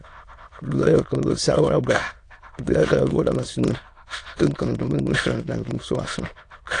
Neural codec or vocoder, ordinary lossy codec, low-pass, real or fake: autoencoder, 22.05 kHz, a latent of 192 numbers a frame, VITS, trained on many speakers; AAC, 48 kbps; 9.9 kHz; fake